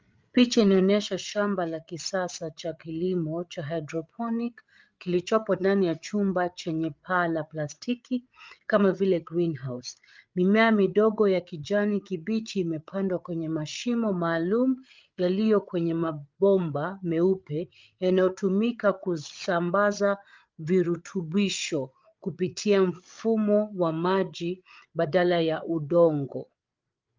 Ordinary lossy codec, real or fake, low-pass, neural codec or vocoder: Opus, 32 kbps; fake; 7.2 kHz; codec, 16 kHz, 8 kbps, FreqCodec, larger model